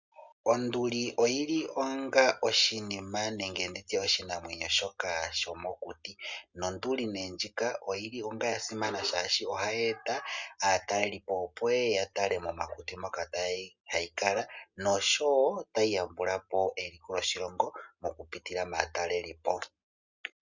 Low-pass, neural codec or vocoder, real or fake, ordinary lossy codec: 7.2 kHz; none; real; Opus, 64 kbps